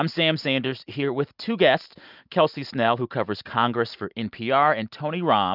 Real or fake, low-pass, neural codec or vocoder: real; 5.4 kHz; none